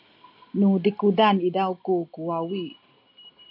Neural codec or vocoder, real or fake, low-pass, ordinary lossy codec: none; real; 5.4 kHz; AAC, 48 kbps